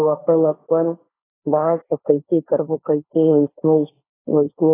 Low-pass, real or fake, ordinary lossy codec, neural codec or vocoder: 3.6 kHz; fake; AAC, 16 kbps; codec, 16 kHz, 1.1 kbps, Voila-Tokenizer